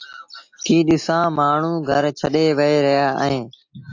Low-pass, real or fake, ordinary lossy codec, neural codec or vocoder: 7.2 kHz; real; AAC, 48 kbps; none